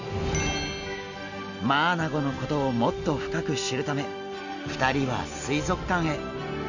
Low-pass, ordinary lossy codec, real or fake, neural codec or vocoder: 7.2 kHz; none; real; none